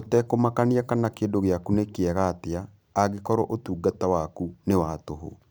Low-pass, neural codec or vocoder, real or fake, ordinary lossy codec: none; vocoder, 44.1 kHz, 128 mel bands every 256 samples, BigVGAN v2; fake; none